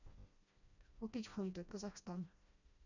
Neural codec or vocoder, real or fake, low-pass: codec, 16 kHz, 1 kbps, FreqCodec, smaller model; fake; 7.2 kHz